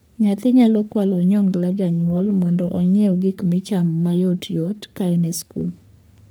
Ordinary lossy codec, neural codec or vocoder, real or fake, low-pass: none; codec, 44.1 kHz, 3.4 kbps, Pupu-Codec; fake; none